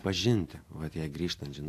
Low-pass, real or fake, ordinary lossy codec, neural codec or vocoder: 14.4 kHz; real; AAC, 64 kbps; none